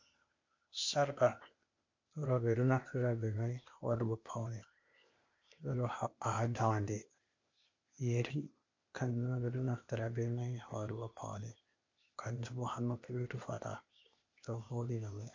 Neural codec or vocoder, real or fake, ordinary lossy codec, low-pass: codec, 16 kHz, 0.8 kbps, ZipCodec; fake; MP3, 48 kbps; 7.2 kHz